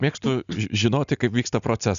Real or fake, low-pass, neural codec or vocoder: real; 7.2 kHz; none